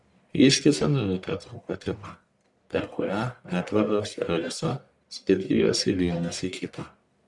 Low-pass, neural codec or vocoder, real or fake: 10.8 kHz; codec, 44.1 kHz, 1.7 kbps, Pupu-Codec; fake